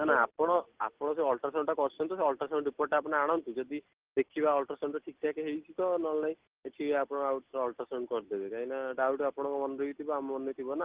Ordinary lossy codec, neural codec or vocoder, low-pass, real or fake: Opus, 16 kbps; none; 3.6 kHz; real